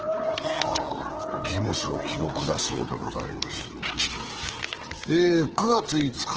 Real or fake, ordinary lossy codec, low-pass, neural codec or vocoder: fake; Opus, 16 kbps; 7.2 kHz; codec, 16 kHz, 4 kbps, FreqCodec, larger model